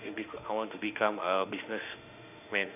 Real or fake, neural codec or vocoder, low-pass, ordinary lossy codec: fake; autoencoder, 48 kHz, 32 numbers a frame, DAC-VAE, trained on Japanese speech; 3.6 kHz; none